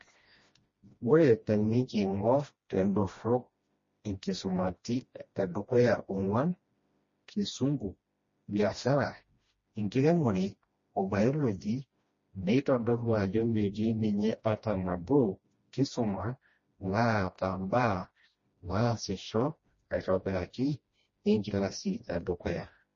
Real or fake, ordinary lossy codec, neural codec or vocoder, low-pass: fake; MP3, 32 kbps; codec, 16 kHz, 1 kbps, FreqCodec, smaller model; 7.2 kHz